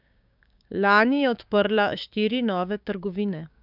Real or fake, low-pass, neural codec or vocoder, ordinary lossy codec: fake; 5.4 kHz; codec, 16 kHz, 6 kbps, DAC; none